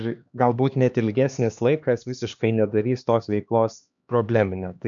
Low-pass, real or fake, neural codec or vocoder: 7.2 kHz; fake; codec, 16 kHz, 2 kbps, X-Codec, HuBERT features, trained on LibriSpeech